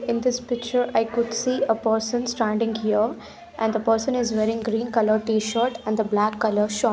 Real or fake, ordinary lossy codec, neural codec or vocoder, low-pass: real; none; none; none